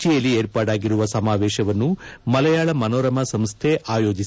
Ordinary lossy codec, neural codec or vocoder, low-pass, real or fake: none; none; none; real